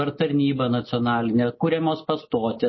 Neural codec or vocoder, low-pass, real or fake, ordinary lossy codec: none; 7.2 kHz; real; MP3, 24 kbps